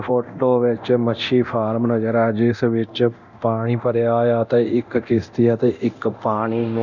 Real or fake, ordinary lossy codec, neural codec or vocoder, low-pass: fake; none; codec, 24 kHz, 0.9 kbps, DualCodec; 7.2 kHz